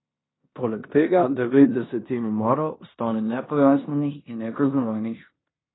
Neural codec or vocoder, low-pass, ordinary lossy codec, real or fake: codec, 16 kHz in and 24 kHz out, 0.9 kbps, LongCat-Audio-Codec, four codebook decoder; 7.2 kHz; AAC, 16 kbps; fake